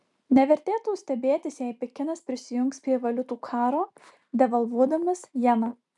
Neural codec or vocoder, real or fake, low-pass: none; real; 10.8 kHz